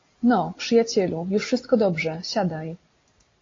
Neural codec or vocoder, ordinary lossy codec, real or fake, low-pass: none; AAC, 32 kbps; real; 7.2 kHz